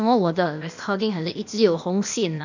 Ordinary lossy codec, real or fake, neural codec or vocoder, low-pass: none; fake; codec, 16 kHz, 0.8 kbps, ZipCodec; 7.2 kHz